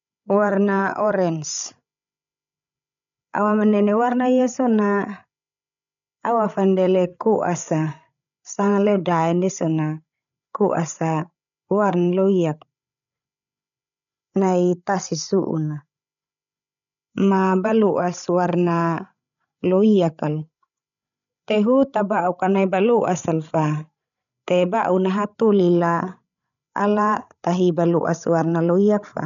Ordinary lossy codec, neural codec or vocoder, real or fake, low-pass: none; codec, 16 kHz, 16 kbps, FreqCodec, larger model; fake; 7.2 kHz